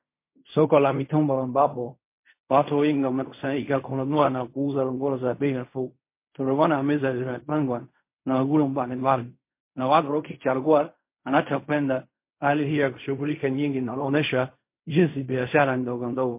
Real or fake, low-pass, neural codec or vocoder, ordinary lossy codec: fake; 3.6 kHz; codec, 16 kHz in and 24 kHz out, 0.4 kbps, LongCat-Audio-Codec, fine tuned four codebook decoder; MP3, 24 kbps